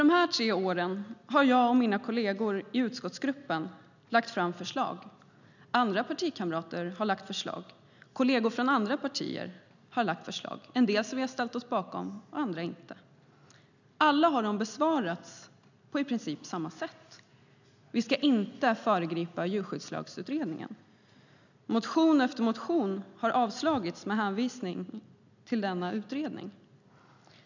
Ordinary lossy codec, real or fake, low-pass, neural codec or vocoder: none; real; 7.2 kHz; none